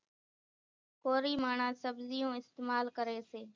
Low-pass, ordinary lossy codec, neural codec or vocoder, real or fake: 7.2 kHz; MP3, 64 kbps; none; real